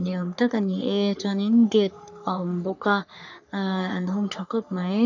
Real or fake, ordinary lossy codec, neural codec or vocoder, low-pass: fake; none; codec, 44.1 kHz, 3.4 kbps, Pupu-Codec; 7.2 kHz